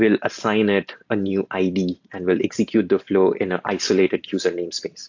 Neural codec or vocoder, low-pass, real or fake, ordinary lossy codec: none; 7.2 kHz; real; AAC, 48 kbps